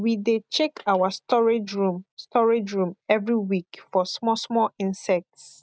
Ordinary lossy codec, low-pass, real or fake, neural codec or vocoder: none; none; real; none